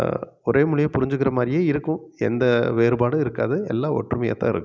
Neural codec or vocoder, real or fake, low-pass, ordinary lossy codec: none; real; none; none